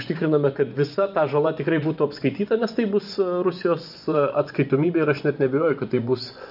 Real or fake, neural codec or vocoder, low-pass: fake; vocoder, 24 kHz, 100 mel bands, Vocos; 5.4 kHz